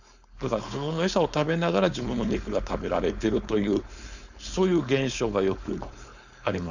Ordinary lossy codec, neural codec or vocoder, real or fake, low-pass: none; codec, 16 kHz, 4.8 kbps, FACodec; fake; 7.2 kHz